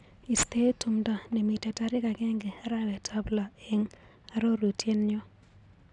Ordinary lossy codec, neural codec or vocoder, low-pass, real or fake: none; none; 10.8 kHz; real